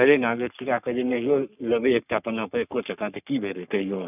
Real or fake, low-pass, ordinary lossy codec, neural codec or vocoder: fake; 3.6 kHz; none; codec, 44.1 kHz, 3.4 kbps, Pupu-Codec